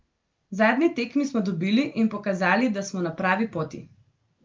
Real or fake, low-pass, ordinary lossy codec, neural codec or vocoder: real; 7.2 kHz; Opus, 24 kbps; none